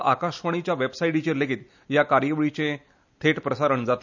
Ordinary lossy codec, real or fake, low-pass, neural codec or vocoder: none; real; 7.2 kHz; none